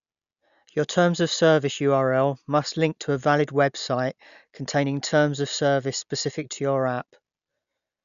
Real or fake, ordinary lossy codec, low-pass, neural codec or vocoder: real; none; 7.2 kHz; none